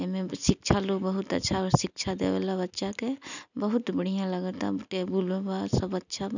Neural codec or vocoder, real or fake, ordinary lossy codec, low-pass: none; real; none; 7.2 kHz